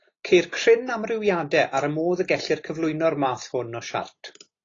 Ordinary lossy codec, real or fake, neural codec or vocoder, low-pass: AAC, 32 kbps; real; none; 7.2 kHz